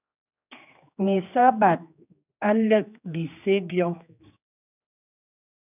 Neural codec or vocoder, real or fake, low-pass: codec, 16 kHz, 2 kbps, X-Codec, HuBERT features, trained on general audio; fake; 3.6 kHz